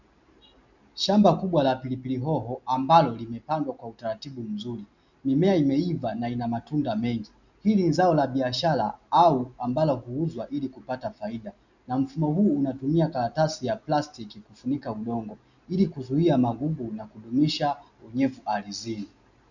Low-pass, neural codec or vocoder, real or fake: 7.2 kHz; none; real